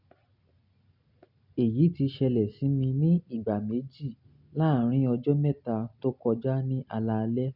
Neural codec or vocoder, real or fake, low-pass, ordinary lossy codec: none; real; 5.4 kHz; none